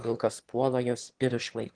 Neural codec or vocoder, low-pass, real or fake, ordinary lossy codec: autoencoder, 22.05 kHz, a latent of 192 numbers a frame, VITS, trained on one speaker; 9.9 kHz; fake; Opus, 16 kbps